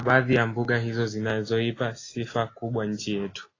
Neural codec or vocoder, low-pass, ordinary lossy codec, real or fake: none; 7.2 kHz; AAC, 32 kbps; real